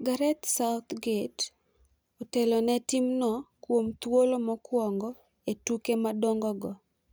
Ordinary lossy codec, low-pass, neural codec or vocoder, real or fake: none; none; none; real